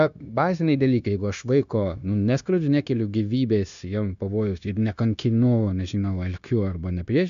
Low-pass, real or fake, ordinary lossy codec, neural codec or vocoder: 7.2 kHz; fake; AAC, 96 kbps; codec, 16 kHz, 0.9 kbps, LongCat-Audio-Codec